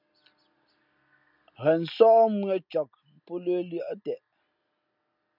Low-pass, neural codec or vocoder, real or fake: 5.4 kHz; none; real